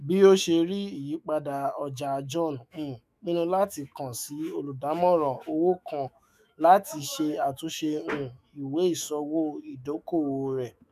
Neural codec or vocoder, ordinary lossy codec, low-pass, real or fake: autoencoder, 48 kHz, 128 numbers a frame, DAC-VAE, trained on Japanese speech; none; 14.4 kHz; fake